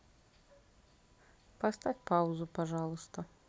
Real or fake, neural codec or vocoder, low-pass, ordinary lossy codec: real; none; none; none